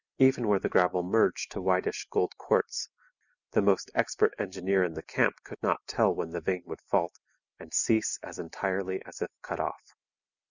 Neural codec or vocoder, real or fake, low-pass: none; real; 7.2 kHz